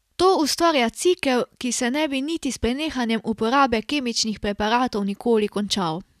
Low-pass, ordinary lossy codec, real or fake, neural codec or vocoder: 14.4 kHz; none; real; none